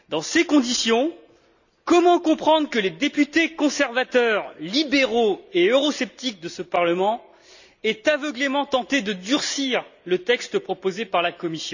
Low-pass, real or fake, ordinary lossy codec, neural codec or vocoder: 7.2 kHz; real; none; none